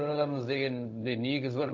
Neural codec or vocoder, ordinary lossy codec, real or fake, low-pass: codec, 16 kHz, 0.4 kbps, LongCat-Audio-Codec; AAC, 48 kbps; fake; 7.2 kHz